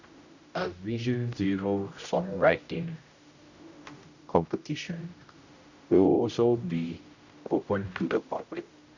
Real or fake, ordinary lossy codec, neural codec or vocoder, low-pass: fake; none; codec, 16 kHz, 0.5 kbps, X-Codec, HuBERT features, trained on general audio; 7.2 kHz